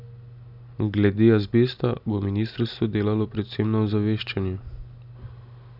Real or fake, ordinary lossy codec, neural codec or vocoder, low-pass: real; none; none; 5.4 kHz